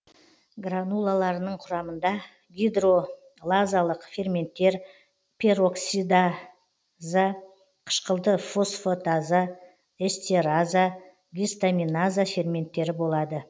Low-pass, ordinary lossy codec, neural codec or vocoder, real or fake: none; none; none; real